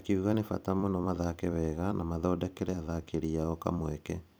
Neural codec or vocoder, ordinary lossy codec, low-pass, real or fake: vocoder, 44.1 kHz, 128 mel bands every 256 samples, BigVGAN v2; none; none; fake